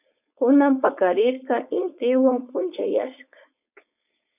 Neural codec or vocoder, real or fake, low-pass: codec, 16 kHz, 4.8 kbps, FACodec; fake; 3.6 kHz